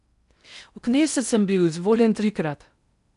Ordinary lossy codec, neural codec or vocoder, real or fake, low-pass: none; codec, 16 kHz in and 24 kHz out, 0.6 kbps, FocalCodec, streaming, 4096 codes; fake; 10.8 kHz